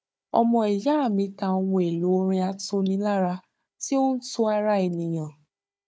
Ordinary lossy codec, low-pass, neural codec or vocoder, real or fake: none; none; codec, 16 kHz, 4 kbps, FunCodec, trained on Chinese and English, 50 frames a second; fake